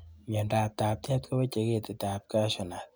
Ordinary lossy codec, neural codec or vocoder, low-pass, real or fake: none; none; none; real